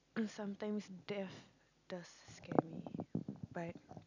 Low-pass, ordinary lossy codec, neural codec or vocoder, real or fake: 7.2 kHz; none; none; real